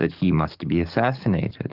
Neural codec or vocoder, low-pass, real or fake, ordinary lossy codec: codec, 44.1 kHz, 7.8 kbps, DAC; 5.4 kHz; fake; Opus, 32 kbps